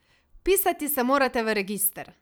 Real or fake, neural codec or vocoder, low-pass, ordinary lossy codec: fake; vocoder, 44.1 kHz, 128 mel bands every 256 samples, BigVGAN v2; none; none